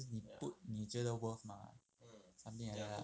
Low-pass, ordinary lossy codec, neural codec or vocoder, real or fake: none; none; none; real